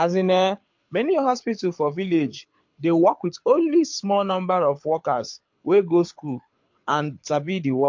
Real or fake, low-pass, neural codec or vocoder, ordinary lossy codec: fake; 7.2 kHz; codec, 24 kHz, 6 kbps, HILCodec; MP3, 48 kbps